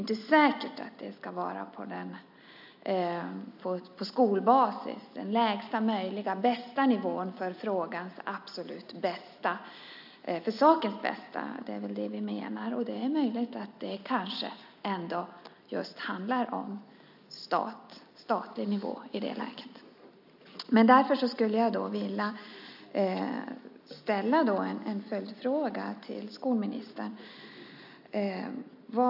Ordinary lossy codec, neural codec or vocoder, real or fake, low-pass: AAC, 48 kbps; none; real; 5.4 kHz